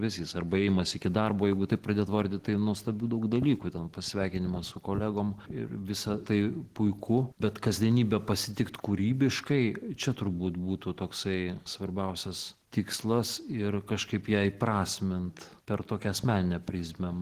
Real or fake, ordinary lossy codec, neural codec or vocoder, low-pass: real; Opus, 16 kbps; none; 14.4 kHz